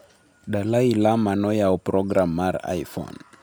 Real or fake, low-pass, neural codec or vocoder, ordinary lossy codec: real; none; none; none